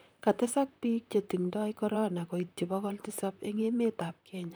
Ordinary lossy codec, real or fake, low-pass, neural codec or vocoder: none; fake; none; vocoder, 44.1 kHz, 128 mel bands, Pupu-Vocoder